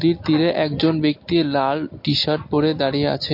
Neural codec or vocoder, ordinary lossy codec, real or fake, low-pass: none; MP3, 32 kbps; real; 5.4 kHz